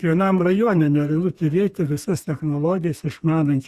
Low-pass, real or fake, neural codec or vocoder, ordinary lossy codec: 14.4 kHz; fake; codec, 32 kHz, 1.9 kbps, SNAC; Opus, 64 kbps